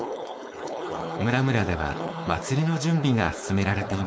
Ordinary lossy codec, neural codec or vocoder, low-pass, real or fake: none; codec, 16 kHz, 4.8 kbps, FACodec; none; fake